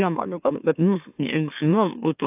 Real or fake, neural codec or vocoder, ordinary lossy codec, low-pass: fake; autoencoder, 44.1 kHz, a latent of 192 numbers a frame, MeloTTS; AAC, 32 kbps; 3.6 kHz